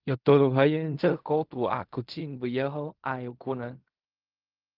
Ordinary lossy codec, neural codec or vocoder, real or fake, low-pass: Opus, 32 kbps; codec, 16 kHz in and 24 kHz out, 0.4 kbps, LongCat-Audio-Codec, fine tuned four codebook decoder; fake; 5.4 kHz